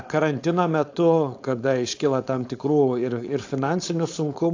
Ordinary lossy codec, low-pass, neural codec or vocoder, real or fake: AAC, 48 kbps; 7.2 kHz; codec, 16 kHz, 4.8 kbps, FACodec; fake